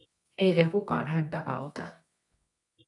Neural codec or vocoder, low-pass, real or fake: codec, 24 kHz, 0.9 kbps, WavTokenizer, medium music audio release; 10.8 kHz; fake